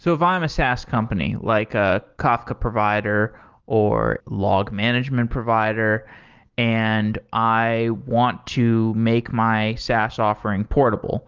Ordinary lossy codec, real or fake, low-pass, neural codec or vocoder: Opus, 32 kbps; real; 7.2 kHz; none